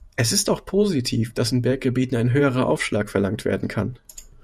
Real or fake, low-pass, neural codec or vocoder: fake; 14.4 kHz; vocoder, 48 kHz, 128 mel bands, Vocos